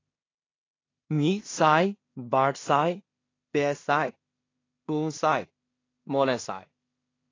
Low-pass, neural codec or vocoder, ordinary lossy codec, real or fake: 7.2 kHz; codec, 16 kHz in and 24 kHz out, 0.4 kbps, LongCat-Audio-Codec, two codebook decoder; AAC, 32 kbps; fake